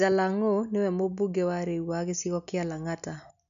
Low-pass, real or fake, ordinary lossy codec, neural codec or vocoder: 7.2 kHz; real; none; none